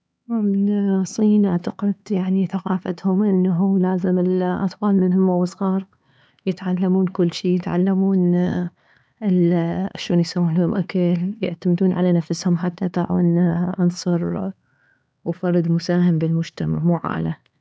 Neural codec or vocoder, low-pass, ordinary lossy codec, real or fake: codec, 16 kHz, 4 kbps, X-Codec, HuBERT features, trained on LibriSpeech; none; none; fake